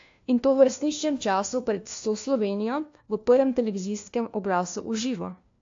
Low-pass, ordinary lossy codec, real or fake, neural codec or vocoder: 7.2 kHz; AAC, 48 kbps; fake; codec, 16 kHz, 1 kbps, FunCodec, trained on LibriTTS, 50 frames a second